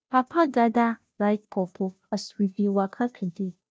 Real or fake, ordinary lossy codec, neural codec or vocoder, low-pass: fake; none; codec, 16 kHz, 0.5 kbps, FunCodec, trained on Chinese and English, 25 frames a second; none